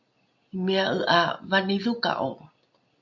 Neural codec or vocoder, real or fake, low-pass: none; real; 7.2 kHz